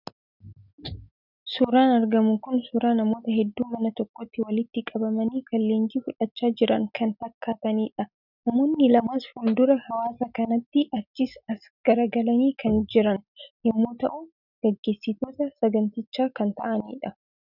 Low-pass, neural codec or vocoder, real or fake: 5.4 kHz; none; real